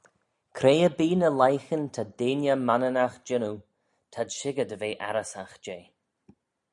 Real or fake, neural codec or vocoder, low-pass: real; none; 10.8 kHz